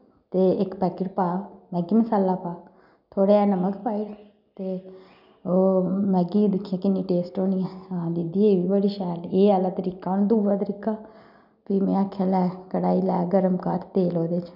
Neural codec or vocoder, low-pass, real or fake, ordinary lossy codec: none; 5.4 kHz; real; none